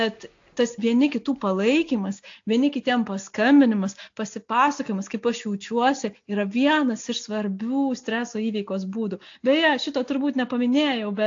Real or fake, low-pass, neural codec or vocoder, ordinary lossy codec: real; 7.2 kHz; none; AAC, 48 kbps